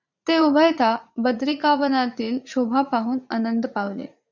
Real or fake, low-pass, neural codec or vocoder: fake; 7.2 kHz; vocoder, 44.1 kHz, 80 mel bands, Vocos